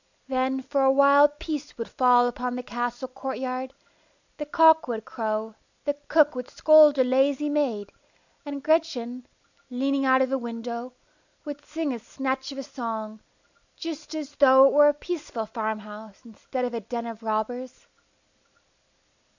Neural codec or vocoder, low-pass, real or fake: none; 7.2 kHz; real